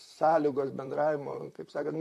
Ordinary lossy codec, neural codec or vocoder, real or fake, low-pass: AAC, 64 kbps; vocoder, 44.1 kHz, 128 mel bands, Pupu-Vocoder; fake; 14.4 kHz